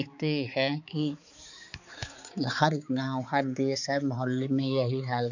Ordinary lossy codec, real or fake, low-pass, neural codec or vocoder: none; fake; 7.2 kHz; codec, 16 kHz, 4 kbps, X-Codec, HuBERT features, trained on balanced general audio